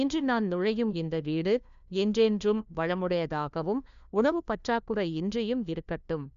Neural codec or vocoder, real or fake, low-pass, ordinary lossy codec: codec, 16 kHz, 1 kbps, FunCodec, trained on LibriTTS, 50 frames a second; fake; 7.2 kHz; MP3, 96 kbps